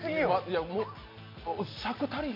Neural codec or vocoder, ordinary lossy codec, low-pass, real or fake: none; MP3, 32 kbps; 5.4 kHz; real